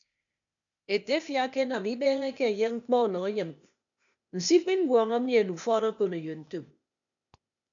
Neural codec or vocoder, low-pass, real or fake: codec, 16 kHz, 0.8 kbps, ZipCodec; 7.2 kHz; fake